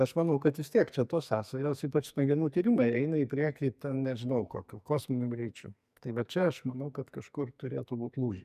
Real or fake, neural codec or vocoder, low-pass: fake; codec, 44.1 kHz, 2.6 kbps, SNAC; 14.4 kHz